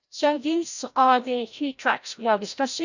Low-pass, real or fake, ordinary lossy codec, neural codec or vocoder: 7.2 kHz; fake; none; codec, 16 kHz, 0.5 kbps, FreqCodec, larger model